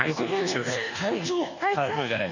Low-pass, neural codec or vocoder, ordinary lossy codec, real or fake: 7.2 kHz; codec, 24 kHz, 1.2 kbps, DualCodec; none; fake